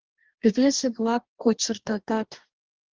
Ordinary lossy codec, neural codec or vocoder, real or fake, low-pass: Opus, 16 kbps; codec, 44.1 kHz, 2.6 kbps, DAC; fake; 7.2 kHz